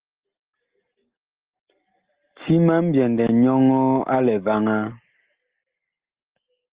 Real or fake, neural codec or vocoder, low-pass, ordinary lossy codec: real; none; 3.6 kHz; Opus, 32 kbps